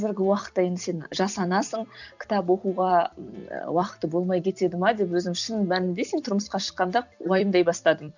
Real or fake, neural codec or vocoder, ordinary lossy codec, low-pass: fake; vocoder, 44.1 kHz, 128 mel bands every 256 samples, BigVGAN v2; none; 7.2 kHz